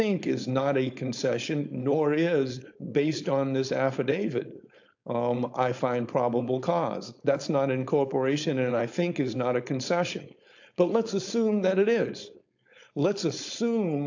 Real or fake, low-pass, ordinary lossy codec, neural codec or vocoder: fake; 7.2 kHz; AAC, 48 kbps; codec, 16 kHz, 4.8 kbps, FACodec